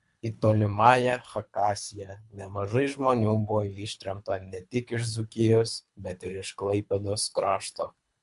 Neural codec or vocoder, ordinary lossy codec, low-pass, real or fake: codec, 24 kHz, 3 kbps, HILCodec; MP3, 64 kbps; 10.8 kHz; fake